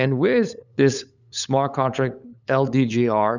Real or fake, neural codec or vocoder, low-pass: fake; codec, 16 kHz, 8 kbps, FunCodec, trained on LibriTTS, 25 frames a second; 7.2 kHz